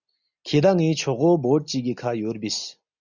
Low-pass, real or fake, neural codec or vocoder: 7.2 kHz; real; none